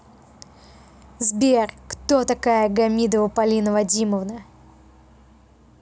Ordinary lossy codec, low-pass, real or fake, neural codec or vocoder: none; none; real; none